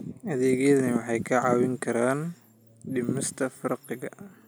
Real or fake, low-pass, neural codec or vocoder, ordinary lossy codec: real; none; none; none